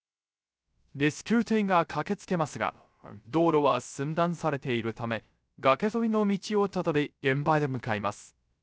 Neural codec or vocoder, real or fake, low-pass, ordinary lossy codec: codec, 16 kHz, 0.3 kbps, FocalCodec; fake; none; none